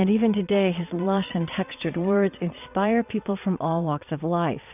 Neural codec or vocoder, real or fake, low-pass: vocoder, 22.05 kHz, 80 mel bands, Vocos; fake; 3.6 kHz